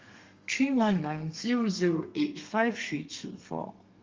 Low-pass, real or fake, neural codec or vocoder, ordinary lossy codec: 7.2 kHz; fake; codec, 32 kHz, 1.9 kbps, SNAC; Opus, 32 kbps